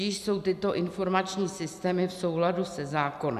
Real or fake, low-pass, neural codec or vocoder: real; 14.4 kHz; none